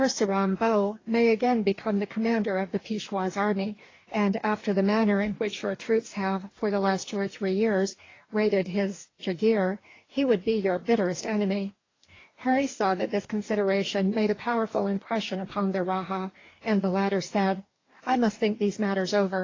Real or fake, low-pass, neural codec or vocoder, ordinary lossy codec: fake; 7.2 kHz; codec, 44.1 kHz, 2.6 kbps, DAC; AAC, 32 kbps